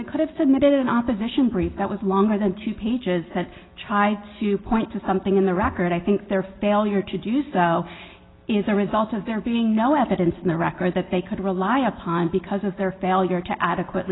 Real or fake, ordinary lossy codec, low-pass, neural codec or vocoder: real; AAC, 16 kbps; 7.2 kHz; none